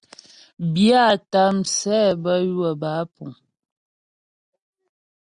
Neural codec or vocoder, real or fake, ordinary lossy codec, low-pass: none; real; Opus, 64 kbps; 9.9 kHz